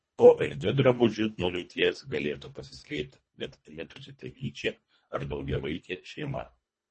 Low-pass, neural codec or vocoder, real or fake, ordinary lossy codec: 10.8 kHz; codec, 24 kHz, 1.5 kbps, HILCodec; fake; MP3, 32 kbps